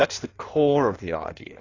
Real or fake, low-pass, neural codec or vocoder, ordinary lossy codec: fake; 7.2 kHz; codec, 16 kHz in and 24 kHz out, 1.1 kbps, FireRedTTS-2 codec; AAC, 32 kbps